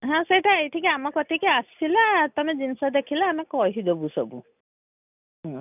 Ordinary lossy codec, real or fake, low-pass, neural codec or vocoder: none; real; 3.6 kHz; none